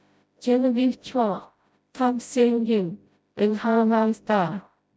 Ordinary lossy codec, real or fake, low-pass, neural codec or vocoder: none; fake; none; codec, 16 kHz, 0.5 kbps, FreqCodec, smaller model